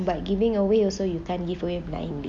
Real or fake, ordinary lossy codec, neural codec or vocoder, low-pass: real; none; none; 9.9 kHz